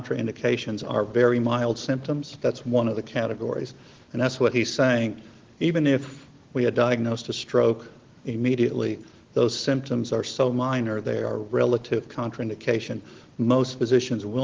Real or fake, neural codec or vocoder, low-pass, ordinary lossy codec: real; none; 7.2 kHz; Opus, 16 kbps